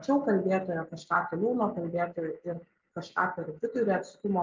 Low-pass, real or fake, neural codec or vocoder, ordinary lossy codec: 7.2 kHz; real; none; Opus, 24 kbps